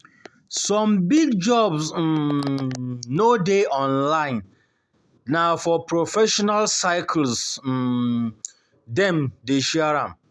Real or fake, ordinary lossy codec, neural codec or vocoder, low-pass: real; none; none; none